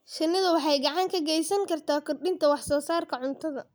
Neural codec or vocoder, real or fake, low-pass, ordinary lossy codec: none; real; none; none